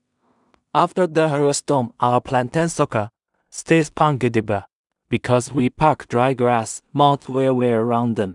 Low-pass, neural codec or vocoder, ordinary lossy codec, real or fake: 10.8 kHz; codec, 16 kHz in and 24 kHz out, 0.4 kbps, LongCat-Audio-Codec, two codebook decoder; none; fake